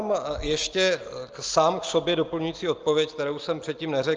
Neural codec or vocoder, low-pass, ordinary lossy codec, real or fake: none; 7.2 kHz; Opus, 24 kbps; real